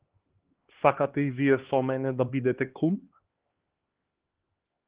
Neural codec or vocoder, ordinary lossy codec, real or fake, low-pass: codec, 16 kHz, 2 kbps, X-Codec, HuBERT features, trained on LibriSpeech; Opus, 16 kbps; fake; 3.6 kHz